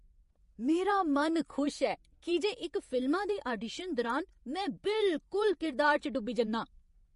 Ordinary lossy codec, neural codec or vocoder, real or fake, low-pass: MP3, 48 kbps; vocoder, 48 kHz, 128 mel bands, Vocos; fake; 14.4 kHz